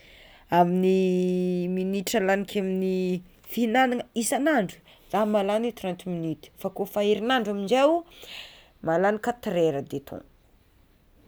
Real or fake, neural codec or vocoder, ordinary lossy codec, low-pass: real; none; none; none